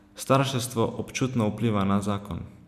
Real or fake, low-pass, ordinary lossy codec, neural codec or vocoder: real; 14.4 kHz; none; none